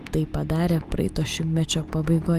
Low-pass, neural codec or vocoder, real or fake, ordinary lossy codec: 14.4 kHz; none; real; Opus, 32 kbps